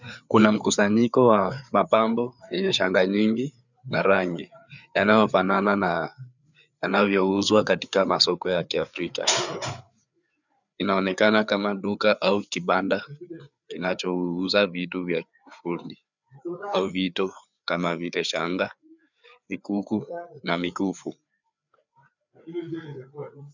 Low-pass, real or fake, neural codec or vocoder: 7.2 kHz; fake; codec, 16 kHz, 4 kbps, FreqCodec, larger model